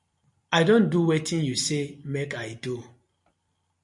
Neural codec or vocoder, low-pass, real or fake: none; 10.8 kHz; real